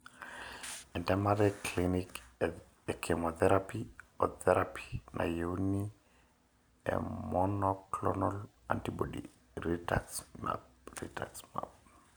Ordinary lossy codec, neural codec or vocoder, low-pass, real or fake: none; none; none; real